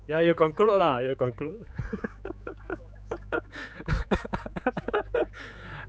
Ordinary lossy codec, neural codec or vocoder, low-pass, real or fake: none; codec, 16 kHz, 4 kbps, X-Codec, HuBERT features, trained on general audio; none; fake